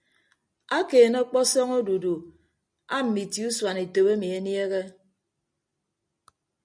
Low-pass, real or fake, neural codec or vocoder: 9.9 kHz; real; none